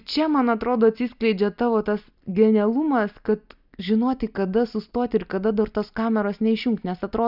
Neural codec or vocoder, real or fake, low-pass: none; real; 5.4 kHz